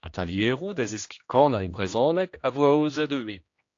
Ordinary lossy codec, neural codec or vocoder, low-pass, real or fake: AAC, 48 kbps; codec, 16 kHz, 1 kbps, X-Codec, HuBERT features, trained on general audio; 7.2 kHz; fake